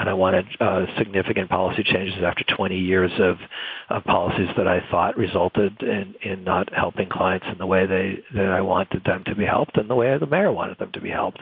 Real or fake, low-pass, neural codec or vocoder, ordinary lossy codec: real; 5.4 kHz; none; AAC, 48 kbps